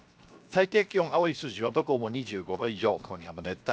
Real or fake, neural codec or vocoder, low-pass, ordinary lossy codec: fake; codec, 16 kHz, 0.7 kbps, FocalCodec; none; none